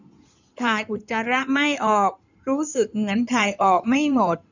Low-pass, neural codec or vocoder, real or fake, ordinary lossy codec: 7.2 kHz; codec, 16 kHz in and 24 kHz out, 2.2 kbps, FireRedTTS-2 codec; fake; none